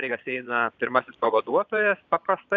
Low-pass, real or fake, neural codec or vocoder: 7.2 kHz; fake; vocoder, 22.05 kHz, 80 mel bands, Vocos